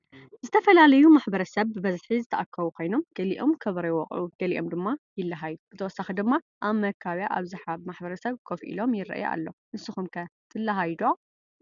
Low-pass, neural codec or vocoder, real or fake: 7.2 kHz; none; real